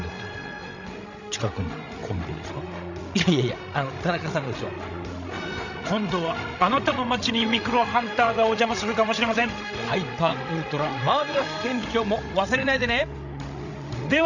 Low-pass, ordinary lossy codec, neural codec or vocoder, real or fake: 7.2 kHz; none; codec, 16 kHz, 16 kbps, FreqCodec, larger model; fake